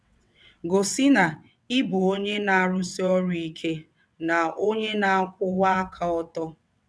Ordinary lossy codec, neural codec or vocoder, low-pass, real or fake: none; vocoder, 22.05 kHz, 80 mel bands, WaveNeXt; none; fake